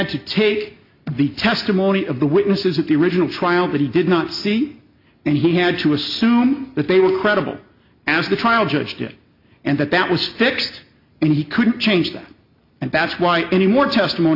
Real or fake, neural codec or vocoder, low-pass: real; none; 5.4 kHz